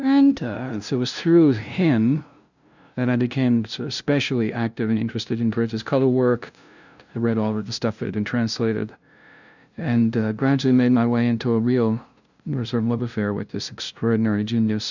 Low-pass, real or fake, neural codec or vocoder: 7.2 kHz; fake; codec, 16 kHz, 0.5 kbps, FunCodec, trained on LibriTTS, 25 frames a second